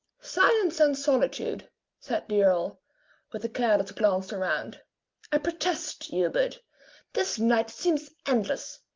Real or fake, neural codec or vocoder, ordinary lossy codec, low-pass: real; none; Opus, 32 kbps; 7.2 kHz